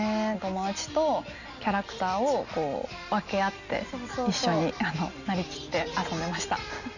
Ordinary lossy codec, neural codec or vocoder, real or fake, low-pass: none; none; real; 7.2 kHz